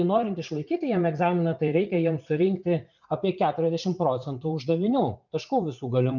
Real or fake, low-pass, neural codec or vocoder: fake; 7.2 kHz; vocoder, 44.1 kHz, 80 mel bands, Vocos